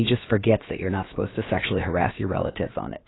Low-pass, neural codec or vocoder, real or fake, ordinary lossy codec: 7.2 kHz; codec, 16 kHz, about 1 kbps, DyCAST, with the encoder's durations; fake; AAC, 16 kbps